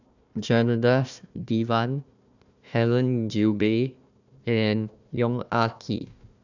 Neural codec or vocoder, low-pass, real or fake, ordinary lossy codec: codec, 16 kHz, 1 kbps, FunCodec, trained on Chinese and English, 50 frames a second; 7.2 kHz; fake; none